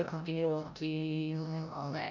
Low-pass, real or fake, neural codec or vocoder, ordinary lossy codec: 7.2 kHz; fake; codec, 16 kHz, 0.5 kbps, FreqCodec, larger model; none